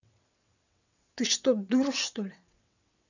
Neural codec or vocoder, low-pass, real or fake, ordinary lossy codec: vocoder, 22.05 kHz, 80 mel bands, WaveNeXt; 7.2 kHz; fake; none